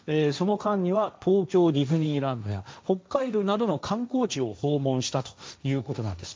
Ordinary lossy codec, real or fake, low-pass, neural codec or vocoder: none; fake; none; codec, 16 kHz, 1.1 kbps, Voila-Tokenizer